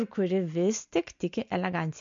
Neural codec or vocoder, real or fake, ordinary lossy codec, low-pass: none; real; MP3, 48 kbps; 7.2 kHz